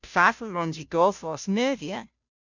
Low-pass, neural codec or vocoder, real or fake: 7.2 kHz; codec, 16 kHz, 0.5 kbps, FunCodec, trained on Chinese and English, 25 frames a second; fake